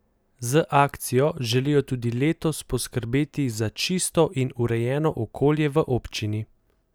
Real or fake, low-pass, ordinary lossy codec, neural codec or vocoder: real; none; none; none